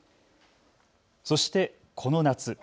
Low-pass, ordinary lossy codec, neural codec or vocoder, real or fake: none; none; none; real